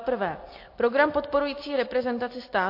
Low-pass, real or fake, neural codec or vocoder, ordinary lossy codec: 5.4 kHz; real; none; MP3, 32 kbps